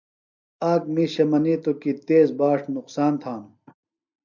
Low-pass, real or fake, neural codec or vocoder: 7.2 kHz; real; none